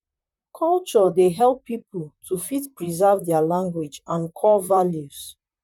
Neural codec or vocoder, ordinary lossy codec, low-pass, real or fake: vocoder, 44.1 kHz, 128 mel bands, Pupu-Vocoder; none; 19.8 kHz; fake